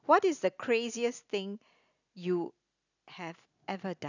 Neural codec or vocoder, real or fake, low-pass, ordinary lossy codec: none; real; 7.2 kHz; none